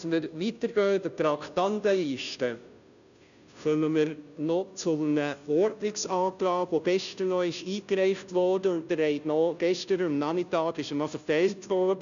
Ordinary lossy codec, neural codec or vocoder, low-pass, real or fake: none; codec, 16 kHz, 0.5 kbps, FunCodec, trained on Chinese and English, 25 frames a second; 7.2 kHz; fake